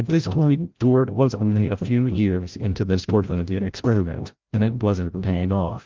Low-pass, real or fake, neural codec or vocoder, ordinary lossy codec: 7.2 kHz; fake; codec, 16 kHz, 0.5 kbps, FreqCodec, larger model; Opus, 32 kbps